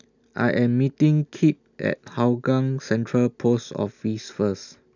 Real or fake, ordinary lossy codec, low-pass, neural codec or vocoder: real; none; 7.2 kHz; none